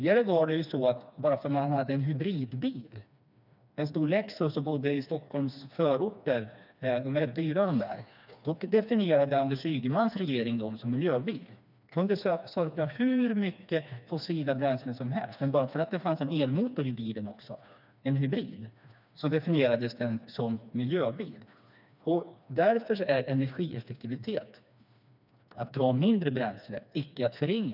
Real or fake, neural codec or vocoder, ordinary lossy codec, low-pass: fake; codec, 16 kHz, 2 kbps, FreqCodec, smaller model; none; 5.4 kHz